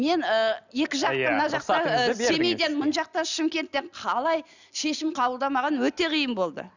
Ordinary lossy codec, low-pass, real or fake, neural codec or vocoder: none; 7.2 kHz; real; none